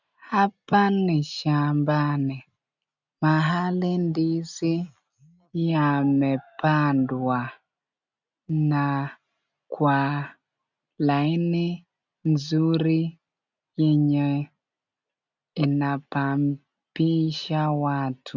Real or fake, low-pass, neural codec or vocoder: real; 7.2 kHz; none